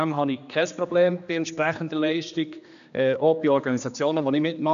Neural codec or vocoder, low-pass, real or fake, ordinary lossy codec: codec, 16 kHz, 2 kbps, X-Codec, HuBERT features, trained on general audio; 7.2 kHz; fake; AAC, 96 kbps